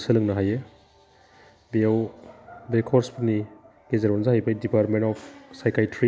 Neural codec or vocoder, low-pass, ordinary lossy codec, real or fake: none; none; none; real